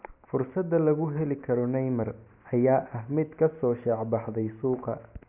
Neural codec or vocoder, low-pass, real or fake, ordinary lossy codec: none; 3.6 kHz; real; none